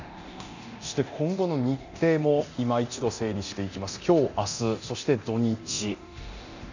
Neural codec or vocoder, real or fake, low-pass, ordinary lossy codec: codec, 24 kHz, 0.9 kbps, DualCodec; fake; 7.2 kHz; none